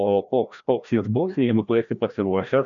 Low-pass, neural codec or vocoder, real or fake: 7.2 kHz; codec, 16 kHz, 1 kbps, FreqCodec, larger model; fake